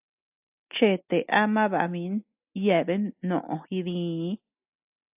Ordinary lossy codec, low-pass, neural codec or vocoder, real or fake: AAC, 32 kbps; 3.6 kHz; none; real